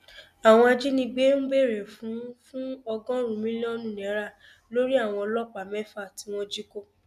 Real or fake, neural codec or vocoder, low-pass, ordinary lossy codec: real; none; 14.4 kHz; none